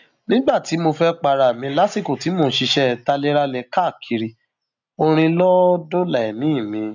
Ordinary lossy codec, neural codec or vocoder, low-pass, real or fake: none; none; 7.2 kHz; real